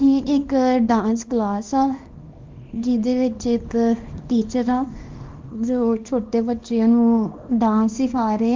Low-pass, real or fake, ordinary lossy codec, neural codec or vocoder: 7.2 kHz; fake; Opus, 32 kbps; codec, 24 kHz, 0.9 kbps, WavTokenizer, small release